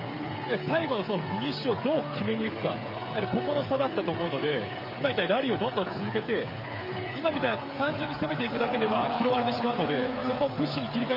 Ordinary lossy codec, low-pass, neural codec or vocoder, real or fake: MP3, 24 kbps; 5.4 kHz; codec, 16 kHz, 8 kbps, FreqCodec, smaller model; fake